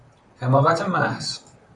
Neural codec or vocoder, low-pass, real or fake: vocoder, 44.1 kHz, 128 mel bands, Pupu-Vocoder; 10.8 kHz; fake